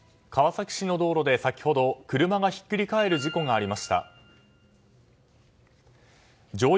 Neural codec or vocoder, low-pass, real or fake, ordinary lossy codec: none; none; real; none